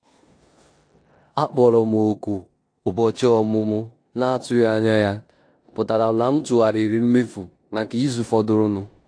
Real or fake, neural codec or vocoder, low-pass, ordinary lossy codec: fake; codec, 16 kHz in and 24 kHz out, 0.9 kbps, LongCat-Audio-Codec, four codebook decoder; 9.9 kHz; AAC, 48 kbps